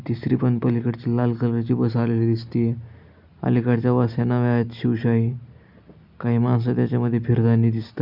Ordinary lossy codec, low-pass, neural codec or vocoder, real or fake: none; 5.4 kHz; none; real